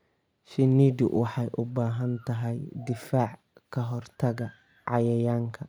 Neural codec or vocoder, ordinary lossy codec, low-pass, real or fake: none; none; 19.8 kHz; real